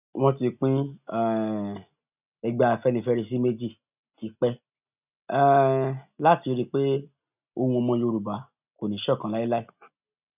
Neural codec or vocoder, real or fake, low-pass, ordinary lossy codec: none; real; 3.6 kHz; none